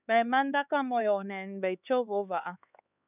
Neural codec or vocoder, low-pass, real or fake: codec, 16 kHz, 4 kbps, X-Codec, HuBERT features, trained on LibriSpeech; 3.6 kHz; fake